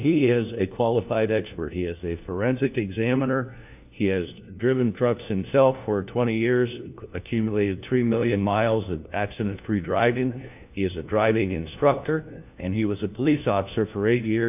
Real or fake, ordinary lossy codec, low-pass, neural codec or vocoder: fake; AAC, 32 kbps; 3.6 kHz; codec, 16 kHz, 1 kbps, FunCodec, trained on LibriTTS, 50 frames a second